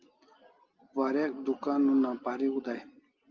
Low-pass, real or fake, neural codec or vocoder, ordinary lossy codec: 7.2 kHz; real; none; Opus, 24 kbps